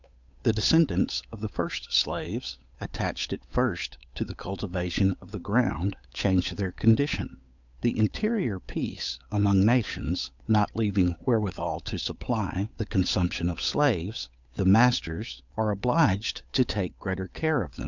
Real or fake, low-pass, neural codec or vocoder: fake; 7.2 kHz; codec, 16 kHz, 8 kbps, FunCodec, trained on Chinese and English, 25 frames a second